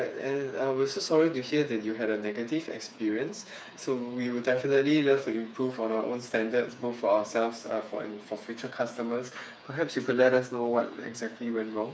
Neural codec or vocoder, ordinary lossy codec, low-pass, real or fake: codec, 16 kHz, 4 kbps, FreqCodec, smaller model; none; none; fake